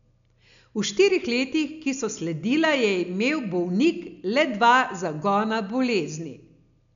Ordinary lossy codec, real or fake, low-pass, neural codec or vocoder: MP3, 96 kbps; real; 7.2 kHz; none